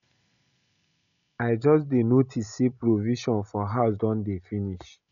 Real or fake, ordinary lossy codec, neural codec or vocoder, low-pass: real; none; none; 7.2 kHz